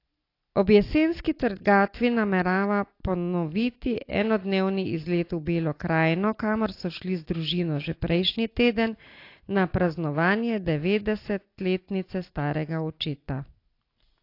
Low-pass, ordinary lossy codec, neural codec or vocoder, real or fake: 5.4 kHz; AAC, 32 kbps; none; real